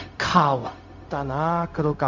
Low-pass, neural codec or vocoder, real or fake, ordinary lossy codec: 7.2 kHz; codec, 16 kHz, 0.4 kbps, LongCat-Audio-Codec; fake; none